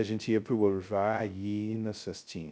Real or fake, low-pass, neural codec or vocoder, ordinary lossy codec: fake; none; codec, 16 kHz, 0.2 kbps, FocalCodec; none